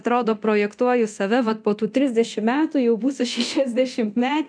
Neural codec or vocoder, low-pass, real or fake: codec, 24 kHz, 0.9 kbps, DualCodec; 9.9 kHz; fake